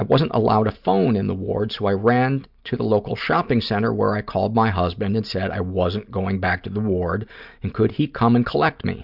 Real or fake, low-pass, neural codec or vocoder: real; 5.4 kHz; none